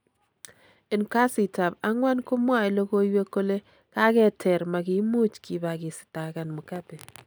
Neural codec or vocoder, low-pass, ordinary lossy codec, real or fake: none; none; none; real